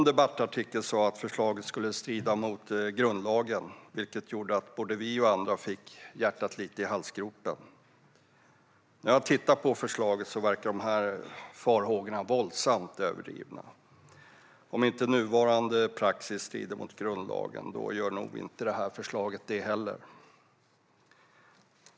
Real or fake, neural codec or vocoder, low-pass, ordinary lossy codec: real; none; none; none